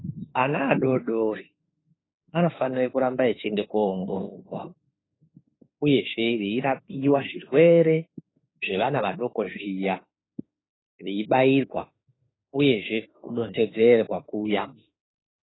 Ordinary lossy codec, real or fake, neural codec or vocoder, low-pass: AAC, 16 kbps; fake; autoencoder, 48 kHz, 32 numbers a frame, DAC-VAE, trained on Japanese speech; 7.2 kHz